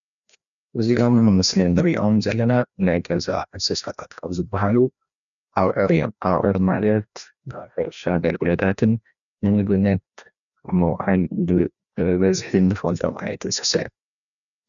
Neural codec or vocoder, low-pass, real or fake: codec, 16 kHz, 1 kbps, FreqCodec, larger model; 7.2 kHz; fake